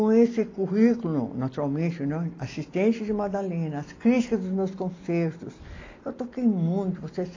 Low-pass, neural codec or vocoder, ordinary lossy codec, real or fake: 7.2 kHz; none; AAC, 32 kbps; real